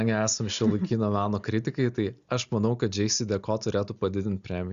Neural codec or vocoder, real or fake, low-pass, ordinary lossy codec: none; real; 7.2 kHz; Opus, 64 kbps